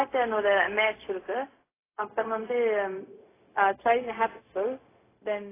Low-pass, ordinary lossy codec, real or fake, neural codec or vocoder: 3.6 kHz; AAC, 16 kbps; fake; codec, 16 kHz, 0.4 kbps, LongCat-Audio-Codec